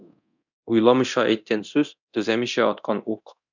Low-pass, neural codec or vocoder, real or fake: 7.2 kHz; codec, 24 kHz, 0.9 kbps, DualCodec; fake